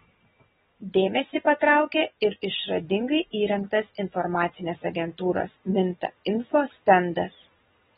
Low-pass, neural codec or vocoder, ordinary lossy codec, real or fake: 7.2 kHz; none; AAC, 16 kbps; real